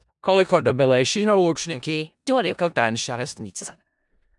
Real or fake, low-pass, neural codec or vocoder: fake; 10.8 kHz; codec, 16 kHz in and 24 kHz out, 0.4 kbps, LongCat-Audio-Codec, four codebook decoder